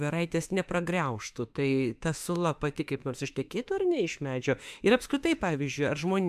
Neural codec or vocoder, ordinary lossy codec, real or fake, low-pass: autoencoder, 48 kHz, 32 numbers a frame, DAC-VAE, trained on Japanese speech; AAC, 96 kbps; fake; 14.4 kHz